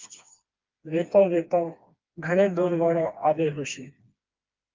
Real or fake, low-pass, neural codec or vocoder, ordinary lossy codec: fake; 7.2 kHz; codec, 16 kHz, 2 kbps, FreqCodec, smaller model; Opus, 24 kbps